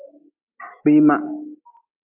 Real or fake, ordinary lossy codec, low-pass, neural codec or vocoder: real; AAC, 24 kbps; 3.6 kHz; none